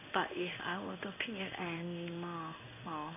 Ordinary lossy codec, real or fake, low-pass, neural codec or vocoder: none; fake; 3.6 kHz; codec, 16 kHz in and 24 kHz out, 1 kbps, XY-Tokenizer